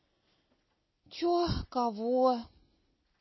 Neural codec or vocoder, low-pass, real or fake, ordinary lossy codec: none; 7.2 kHz; real; MP3, 24 kbps